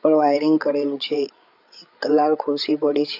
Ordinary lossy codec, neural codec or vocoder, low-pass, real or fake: none; codec, 16 kHz, 8 kbps, FreqCodec, larger model; 5.4 kHz; fake